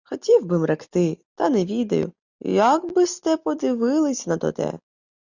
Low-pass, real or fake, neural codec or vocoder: 7.2 kHz; real; none